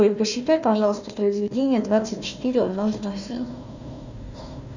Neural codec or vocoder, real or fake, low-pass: codec, 16 kHz, 1 kbps, FunCodec, trained on Chinese and English, 50 frames a second; fake; 7.2 kHz